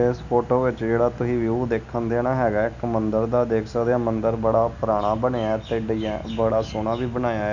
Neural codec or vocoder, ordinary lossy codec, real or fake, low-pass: none; none; real; 7.2 kHz